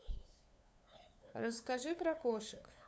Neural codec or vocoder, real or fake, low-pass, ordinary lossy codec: codec, 16 kHz, 2 kbps, FunCodec, trained on LibriTTS, 25 frames a second; fake; none; none